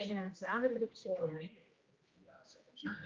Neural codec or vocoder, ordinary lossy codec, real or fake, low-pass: codec, 16 kHz, 1 kbps, X-Codec, HuBERT features, trained on general audio; Opus, 24 kbps; fake; 7.2 kHz